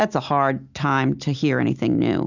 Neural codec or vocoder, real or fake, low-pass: none; real; 7.2 kHz